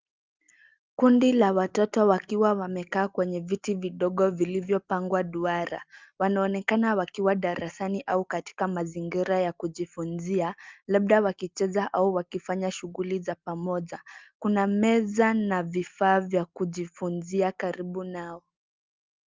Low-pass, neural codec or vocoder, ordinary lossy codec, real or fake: 7.2 kHz; none; Opus, 24 kbps; real